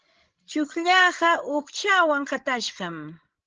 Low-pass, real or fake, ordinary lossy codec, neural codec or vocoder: 7.2 kHz; fake; Opus, 16 kbps; codec, 16 kHz, 16 kbps, FreqCodec, larger model